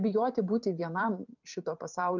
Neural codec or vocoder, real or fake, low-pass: vocoder, 44.1 kHz, 128 mel bands every 256 samples, BigVGAN v2; fake; 7.2 kHz